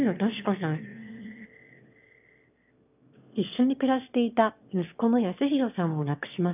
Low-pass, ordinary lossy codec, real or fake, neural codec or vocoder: 3.6 kHz; none; fake; autoencoder, 22.05 kHz, a latent of 192 numbers a frame, VITS, trained on one speaker